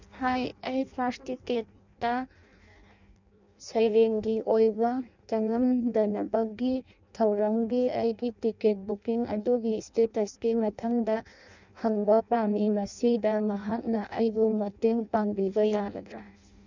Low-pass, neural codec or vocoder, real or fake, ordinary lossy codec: 7.2 kHz; codec, 16 kHz in and 24 kHz out, 0.6 kbps, FireRedTTS-2 codec; fake; none